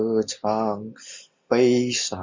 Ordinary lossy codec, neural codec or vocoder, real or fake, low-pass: MP3, 64 kbps; none; real; 7.2 kHz